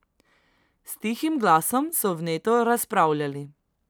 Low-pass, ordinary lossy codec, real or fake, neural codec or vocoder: none; none; real; none